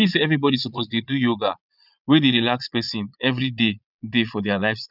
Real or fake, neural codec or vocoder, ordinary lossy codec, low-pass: fake; vocoder, 22.05 kHz, 80 mel bands, Vocos; none; 5.4 kHz